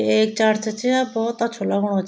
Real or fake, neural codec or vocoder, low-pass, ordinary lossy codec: real; none; none; none